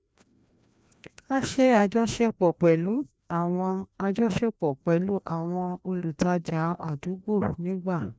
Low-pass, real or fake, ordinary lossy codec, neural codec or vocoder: none; fake; none; codec, 16 kHz, 1 kbps, FreqCodec, larger model